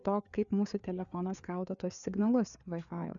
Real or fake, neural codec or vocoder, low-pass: fake; codec, 16 kHz, 4 kbps, FreqCodec, larger model; 7.2 kHz